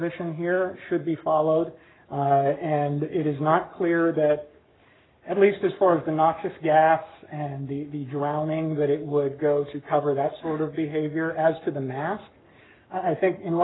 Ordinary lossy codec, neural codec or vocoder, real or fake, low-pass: AAC, 16 kbps; codec, 44.1 kHz, 7.8 kbps, Pupu-Codec; fake; 7.2 kHz